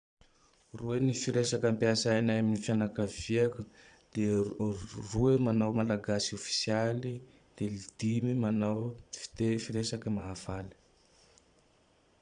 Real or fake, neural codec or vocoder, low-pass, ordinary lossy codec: fake; vocoder, 22.05 kHz, 80 mel bands, Vocos; 9.9 kHz; none